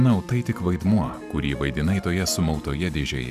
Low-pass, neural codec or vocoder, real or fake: 14.4 kHz; vocoder, 44.1 kHz, 128 mel bands every 512 samples, BigVGAN v2; fake